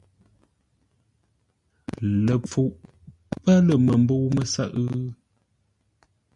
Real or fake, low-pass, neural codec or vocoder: real; 10.8 kHz; none